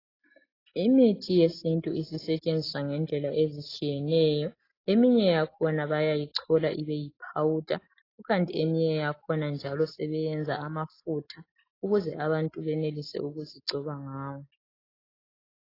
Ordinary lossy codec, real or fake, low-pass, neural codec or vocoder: AAC, 24 kbps; real; 5.4 kHz; none